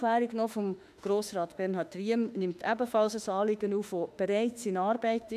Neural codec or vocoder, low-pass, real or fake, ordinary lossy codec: autoencoder, 48 kHz, 32 numbers a frame, DAC-VAE, trained on Japanese speech; 14.4 kHz; fake; none